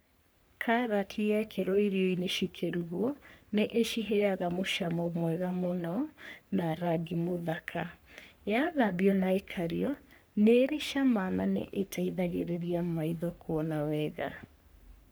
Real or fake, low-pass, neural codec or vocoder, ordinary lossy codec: fake; none; codec, 44.1 kHz, 3.4 kbps, Pupu-Codec; none